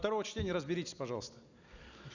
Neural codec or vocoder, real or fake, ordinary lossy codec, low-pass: none; real; none; 7.2 kHz